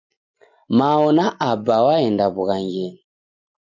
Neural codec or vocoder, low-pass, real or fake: none; 7.2 kHz; real